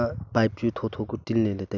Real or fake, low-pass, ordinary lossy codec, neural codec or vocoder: real; 7.2 kHz; none; none